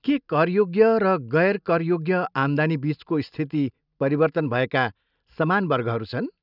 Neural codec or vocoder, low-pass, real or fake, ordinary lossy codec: none; 5.4 kHz; real; none